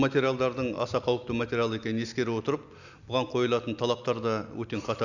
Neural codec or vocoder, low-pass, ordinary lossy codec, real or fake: none; 7.2 kHz; none; real